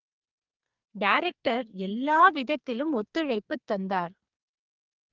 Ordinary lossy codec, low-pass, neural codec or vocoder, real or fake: Opus, 24 kbps; 7.2 kHz; codec, 44.1 kHz, 2.6 kbps, SNAC; fake